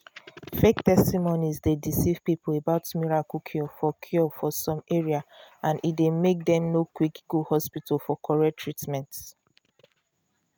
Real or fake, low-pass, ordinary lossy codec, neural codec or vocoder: real; none; none; none